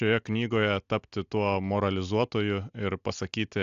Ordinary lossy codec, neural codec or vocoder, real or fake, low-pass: AAC, 96 kbps; none; real; 7.2 kHz